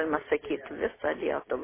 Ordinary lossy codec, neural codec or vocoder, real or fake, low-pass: MP3, 16 kbps; none; real; 3.6 kHz